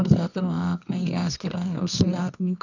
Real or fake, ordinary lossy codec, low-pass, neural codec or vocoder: fake; none; 7.2 kHz; codec, 24 kHz, 0.9 kbps, WavTokenizer, medium music audio release